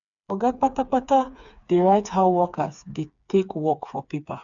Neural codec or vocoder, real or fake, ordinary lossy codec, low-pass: codec, 16 kHz, 4 kbps, FreqCodec, smaller model; fake; none; 7.2 kHz